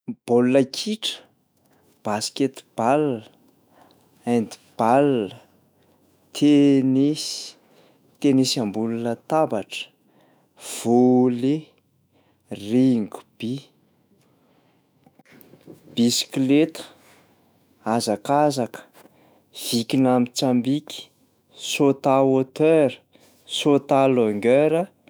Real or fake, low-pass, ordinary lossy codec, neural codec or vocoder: fake; none; none; autoencoder, 48 kHz, 128 numbers a frame, DAC-VAE, trained on Japanese speech